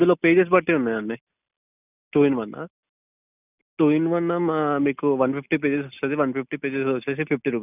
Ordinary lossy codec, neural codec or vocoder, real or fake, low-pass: none; none; real; 3.6 kHz